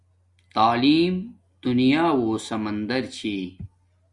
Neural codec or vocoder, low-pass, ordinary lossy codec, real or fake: none; 10.8 kHz; Opus, 64 kbps; real